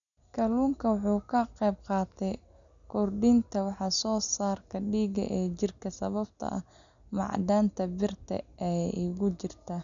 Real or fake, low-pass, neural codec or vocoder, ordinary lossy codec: real; 7.2 kHz; none; none